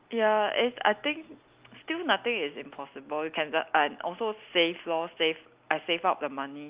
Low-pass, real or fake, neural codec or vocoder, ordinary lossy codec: 3.6 kHz; real; none; Opus, 24 kbps